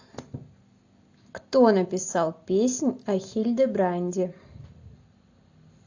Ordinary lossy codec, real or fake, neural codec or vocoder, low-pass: AAC, 48 kbps; real; none; 7.2 kHz